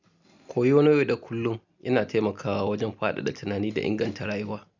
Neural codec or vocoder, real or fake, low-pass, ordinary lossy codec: none; real; 7.2 kHz; none